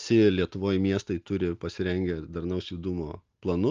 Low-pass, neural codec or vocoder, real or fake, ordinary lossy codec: 7.2 kHz; none; real; Opus, 32 kbps